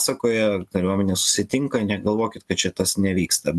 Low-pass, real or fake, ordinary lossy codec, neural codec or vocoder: 14.4 kHz; real; AAC, 96 kbps; none